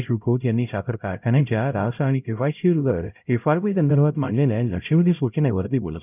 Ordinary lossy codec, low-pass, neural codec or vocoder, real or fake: none; 3.6 kHz; codec, 16 kHz, 0.5 kbps, X-Codec, HuBERT features, trained on LibriSpeech; fake